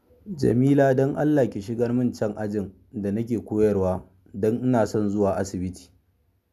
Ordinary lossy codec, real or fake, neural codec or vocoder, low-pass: none; real; none; 14.4 kHz